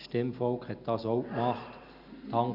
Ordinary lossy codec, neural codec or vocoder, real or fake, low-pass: none; none; real; 5.4 kHz